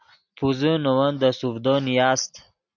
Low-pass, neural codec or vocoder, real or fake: 7.2 kHz; none; real